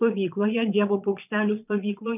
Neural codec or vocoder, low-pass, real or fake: vocoder, 22.05 kHz, 80 mel bands, Vocos; 3.6 kHz; fake